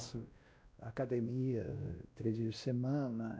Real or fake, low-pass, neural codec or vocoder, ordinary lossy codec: fake; none; codec, 16 kHz, 1 kbps, X-Codec, WavLM features, trained on Multilingual LibriSpeech; none